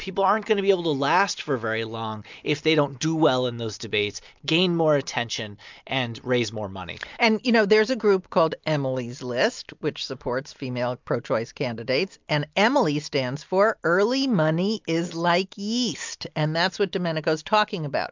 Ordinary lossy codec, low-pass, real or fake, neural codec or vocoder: MP3, 64 kbps; 7.2 kHz; real; none